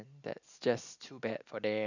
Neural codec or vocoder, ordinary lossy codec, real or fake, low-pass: none; none; real; 7.2 kHz